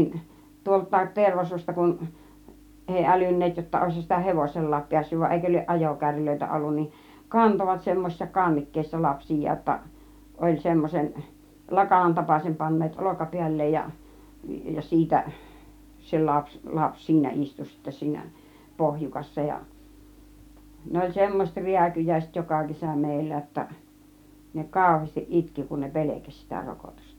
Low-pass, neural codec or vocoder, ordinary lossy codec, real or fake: 19.8 kHz; none; none; real